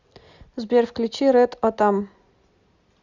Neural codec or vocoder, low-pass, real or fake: none; 7.2 kHz; real